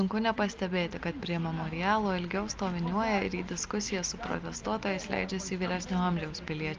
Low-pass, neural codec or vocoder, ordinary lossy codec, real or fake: 7.2 kHz; none; Opus, 24 kbps; real